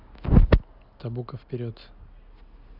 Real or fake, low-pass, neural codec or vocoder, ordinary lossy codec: real; 5.4 kHz; none; none